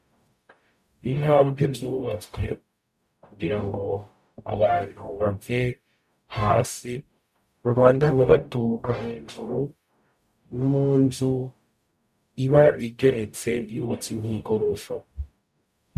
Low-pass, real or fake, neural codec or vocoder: 14.4 kHz; fake; codec, 44.1 kHz, 0.9 kbps, DAC